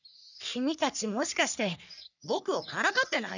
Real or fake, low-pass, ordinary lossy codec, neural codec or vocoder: fake; 7.2 kHz; none; codec, 44.1 kHz, 3.4 kbps, Pupu-Codec